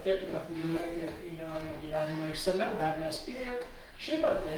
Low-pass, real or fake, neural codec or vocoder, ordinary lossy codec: 19.8 kHz; fake; codec, 44.1 kHz, 2.6 kbps, DAC; Opus, 32 kbps